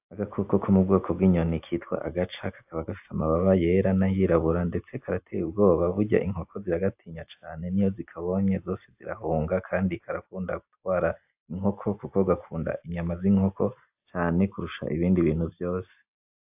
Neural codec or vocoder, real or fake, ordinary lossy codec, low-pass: none; real; AAC, 32 kbps; 3.6 kHz